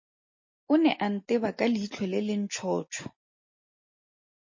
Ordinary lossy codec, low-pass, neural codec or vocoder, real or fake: MP3, 32 kbps; 7.2 kHz; none; real